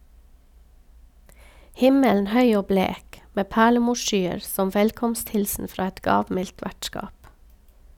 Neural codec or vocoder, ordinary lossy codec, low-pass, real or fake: none; none; 19.8 kHz; real